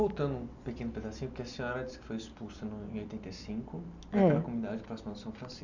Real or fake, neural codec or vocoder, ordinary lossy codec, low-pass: real; none; none; 7.2 kHz